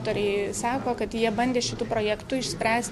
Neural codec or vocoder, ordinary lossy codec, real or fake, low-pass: none; MP3, 64 kbps; real; 14.4 kHz